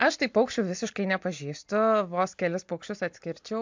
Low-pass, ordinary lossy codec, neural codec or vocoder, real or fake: 7.2 kHz; MP3, 64 kbps; none; real